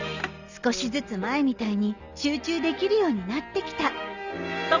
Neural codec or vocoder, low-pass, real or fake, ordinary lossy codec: none; 7.2 kHz; real; Opus, 64 kbps